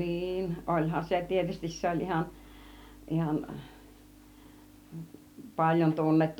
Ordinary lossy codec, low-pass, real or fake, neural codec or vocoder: none; 19.8 kHz; real; none